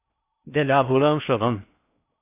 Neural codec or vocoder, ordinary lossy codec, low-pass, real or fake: codec, 16 kHz in and 24 kHz out, 0.6 kbps, FocalCodec, streaming, 2048 codes; AAC, 32 kbps; 3.6 kHz; fake